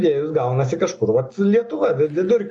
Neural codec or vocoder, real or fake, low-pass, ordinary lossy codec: none; real; 9.9 kHz; AAC, 64 kbps